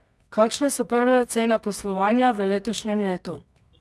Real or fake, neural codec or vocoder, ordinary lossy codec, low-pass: fake; codec, 24 kHz, 0.9 kbps, WavTokenizer, medium music audio release; none; none